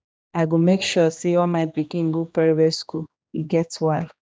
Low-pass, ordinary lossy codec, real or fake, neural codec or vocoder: none; none; fake; codec, 16 kHz, 2 kbps, X-Codec, HuBERT features, trained on general audio